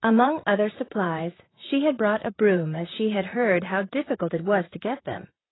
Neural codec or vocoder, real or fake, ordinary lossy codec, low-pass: vocoder, 44.1 kHz, 128 mel bands, Pupu-Vocoder; fake; AAC, 16 kbps; 7.2 kHz